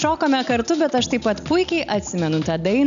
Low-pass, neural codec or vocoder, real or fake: 7.2 kHz; none; real